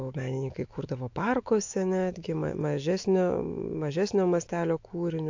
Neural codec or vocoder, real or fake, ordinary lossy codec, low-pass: autoencoder, 48 kHz, 128 numbers a frame, DAC-VAE, trained on Japanese speech; fake; AAC, 48 kbps; 7.2 kHz